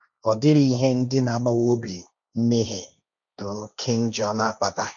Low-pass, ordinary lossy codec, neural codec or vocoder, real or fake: 7.2 kHz; none; codec, 16 kHz, 1.1 kbps, Voila-Tokenizer; fake